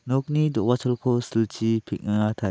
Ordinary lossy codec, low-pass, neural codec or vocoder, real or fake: none; none; none; real